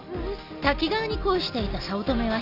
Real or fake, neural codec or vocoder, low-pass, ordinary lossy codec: real; none; 5.4 kHz; none